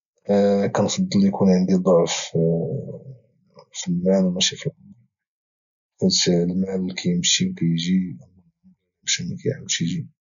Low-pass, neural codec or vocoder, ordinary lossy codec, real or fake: 7.2 kHz; none; none; real